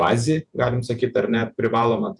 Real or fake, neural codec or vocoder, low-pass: fake; vocoder, 48 kHz, 128 mel bands, Vocos; 10.8 kHz